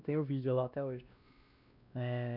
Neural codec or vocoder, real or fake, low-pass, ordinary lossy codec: codec, 16 kHz, 1 kbps, X-Codec, WavLM features, trained on Multilingual LibriSpeech; fake; 5.4 kHz; none